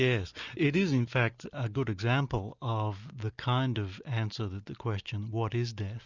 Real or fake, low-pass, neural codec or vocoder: real; 7.2 kHz; none